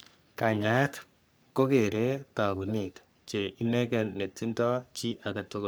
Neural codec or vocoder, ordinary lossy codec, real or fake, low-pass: codec, 44.1 kHz, 3.4 kbps, Pupu-Codec; none; fake; none